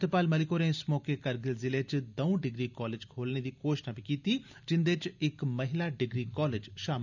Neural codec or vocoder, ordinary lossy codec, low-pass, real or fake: none; none; 7.2 kHz; real